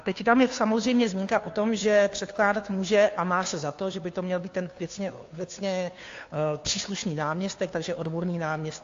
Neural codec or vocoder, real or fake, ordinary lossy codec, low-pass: codec, 16 kHz, 2 kbps, FunCodec, trained on Chinese and English, 25 frames a second; fake; AAC, 48 kbps; 7.2 kHz